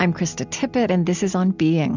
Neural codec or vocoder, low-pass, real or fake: none; 7.2 kHz; real